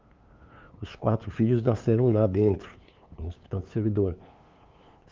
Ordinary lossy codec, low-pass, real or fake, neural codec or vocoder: Opus, 32 kbps; 7.2 kHz; fake; codec, 16 kHz, 2 kbps, FunCodec, trained on LibriTTS, 25 frames a second